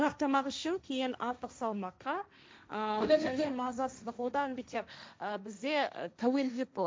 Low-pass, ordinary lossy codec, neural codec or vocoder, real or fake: none; none; codec, 16 kHz, 1.1 kbps, Voila-Tokenizer; fake